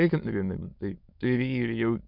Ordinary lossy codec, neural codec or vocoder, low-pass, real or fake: MP3, 48 kbps; autoencoder, 22.05 kHz, a latent of 192 numbers a frame, VITS, trained on many speakers; 5.4 kHz; fake